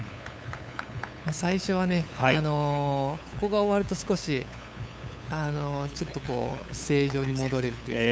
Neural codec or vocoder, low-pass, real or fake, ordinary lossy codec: codec, 16 kHz, 8 kbps, FunCodec, trained on LibriTTS, 25 frames a second; none; fake; none